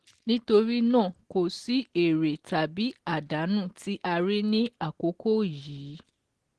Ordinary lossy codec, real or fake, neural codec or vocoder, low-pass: Opus, 16 kbps; real; none; 10.8 kHz